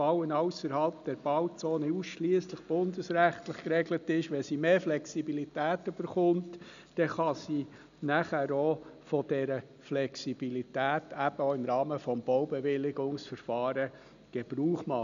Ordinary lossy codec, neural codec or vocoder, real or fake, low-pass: none; none; real; 7.2 kHz